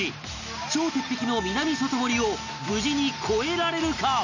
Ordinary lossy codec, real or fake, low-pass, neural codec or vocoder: none; real; 7.2 kHz; none